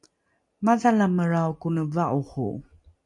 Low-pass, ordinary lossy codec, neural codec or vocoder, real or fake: 10.8 kHz; MP3, 64 kbps; none; real